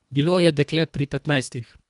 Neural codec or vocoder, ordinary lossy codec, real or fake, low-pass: codec, 24 kHz, 1.5 kbps, HILCodec; none; fake; 10.8 kHz